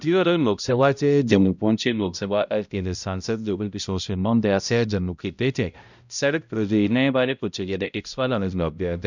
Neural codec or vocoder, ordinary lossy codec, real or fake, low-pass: codec, 16 kHz, 0.5 kbps, X-Codec, HuBERT features, trained on balanced general audio; none; fake; 7.2 kHz